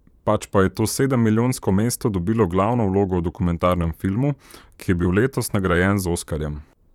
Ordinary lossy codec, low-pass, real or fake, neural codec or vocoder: none; 19.8 kHz; fake; vocoder, 44.1 kHz, 128 mel bands, Pupu-Vocoder